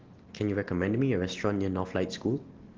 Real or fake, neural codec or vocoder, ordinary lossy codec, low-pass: real; none; Opus, 16 kbps; 7.2 kHz